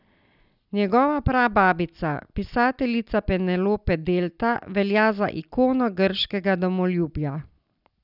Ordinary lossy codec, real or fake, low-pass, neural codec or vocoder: none; real; 5.4 kHz; none